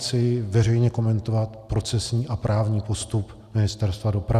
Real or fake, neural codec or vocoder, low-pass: real; none; 14.4 kHz